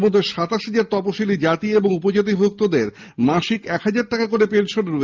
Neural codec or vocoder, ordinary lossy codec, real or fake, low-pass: none; Opus, 24 kbps; real; 7.2 kHz